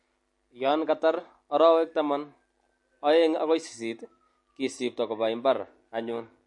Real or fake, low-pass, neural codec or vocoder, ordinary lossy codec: real; 10.8 kHz; none; MP3, 48 kbps